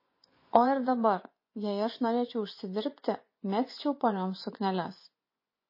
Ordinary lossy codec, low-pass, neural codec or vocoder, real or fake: MP3, 24 kbps; 5.4 kHz; vocoder, 24 kHz, 100 mel bands, Vocos; fake